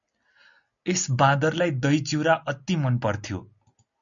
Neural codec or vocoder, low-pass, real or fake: none; 7.2 kHz; real